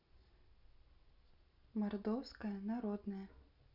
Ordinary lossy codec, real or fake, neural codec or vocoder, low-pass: none; real; none; 5.4 kHz